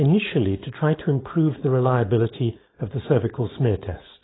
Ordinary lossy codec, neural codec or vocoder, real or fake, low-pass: AAC, 16 kbps; codec, 16 kHz, 4.8 kbps, FACodec; fake; 7.2 kHz